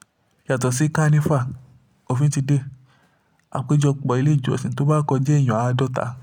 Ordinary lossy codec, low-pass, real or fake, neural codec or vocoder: none; 19.8 kHz; real; none